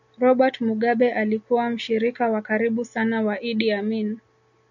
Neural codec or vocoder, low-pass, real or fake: none; 7.2 kHz; real